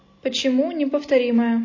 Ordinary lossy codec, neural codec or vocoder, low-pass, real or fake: MP3, 32 kbps; none; 7.2 kHz; real